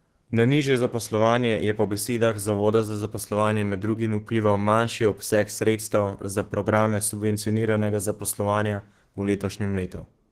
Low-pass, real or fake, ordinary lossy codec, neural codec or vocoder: 14.4 kHz; fake; Opus, 16 kbps; codec, 32 kHz, 1.9 kbps, SNAC